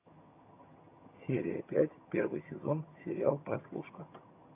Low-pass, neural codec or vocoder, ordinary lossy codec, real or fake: 3.6 kHz; vocoder, 22.05 kHz, 80 mel bands, HiFi-GAN; AAC, 32 kbps; fake